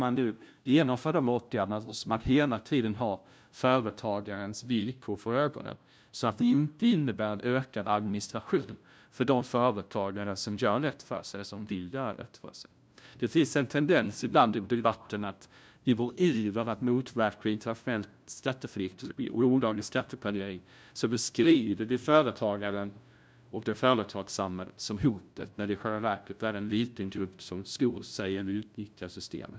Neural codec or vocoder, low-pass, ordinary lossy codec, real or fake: codec, 16 kHz, 0.5 kbps, FunCodec, trained on LibriTTS, 25 frames a second; none; none; fake